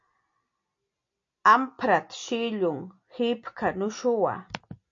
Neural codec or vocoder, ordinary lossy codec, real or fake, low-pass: none; AAC, 48 kbps; real; 7.2 kHz